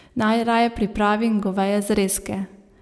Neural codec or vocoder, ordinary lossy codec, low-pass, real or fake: none; none; none; real